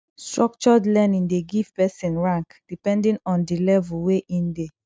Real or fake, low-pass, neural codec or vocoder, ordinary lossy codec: real; none; none; none